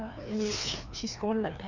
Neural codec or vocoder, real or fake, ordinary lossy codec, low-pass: codec, 16 kHz, 2 kbps, FreqCodec, larger model; fake; none; 7.2 kHz